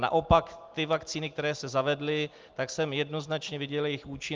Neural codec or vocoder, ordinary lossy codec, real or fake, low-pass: none; Opus, 32 kbps; real; 7.2 kHz